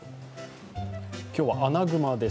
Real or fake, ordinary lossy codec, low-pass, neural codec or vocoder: real; none; none; none